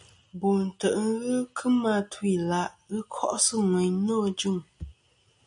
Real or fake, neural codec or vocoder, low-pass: real; none; 9.9 kHz